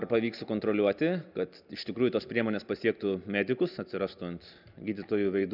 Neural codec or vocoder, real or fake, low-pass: none; real; 5.4 kHz